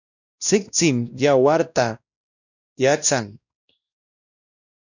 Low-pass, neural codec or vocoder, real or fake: 7.2 kHz; codec, 16 kHz, 1 kbps, X-Codec, WavLM features, trained on Multilingual LibriSpeech; fake